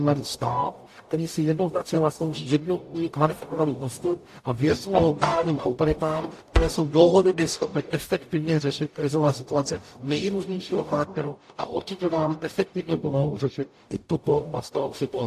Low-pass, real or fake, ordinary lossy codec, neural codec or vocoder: 14.4 kHz; fake; AAC, 64 kbps; codec, 44.1 kHz, 0.9 kbps, DAC